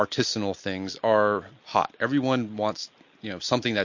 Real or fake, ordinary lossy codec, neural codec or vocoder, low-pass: real; MP3, 48 kbps; none; 7.2 kHz